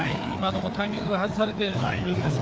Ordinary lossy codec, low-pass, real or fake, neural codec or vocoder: none; none; fake; codec, 16 kHz, 4 kbps, FreqCodec, larger model